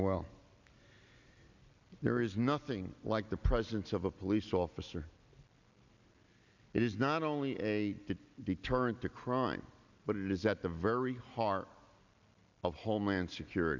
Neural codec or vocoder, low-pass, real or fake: none; 7.2 kHz; real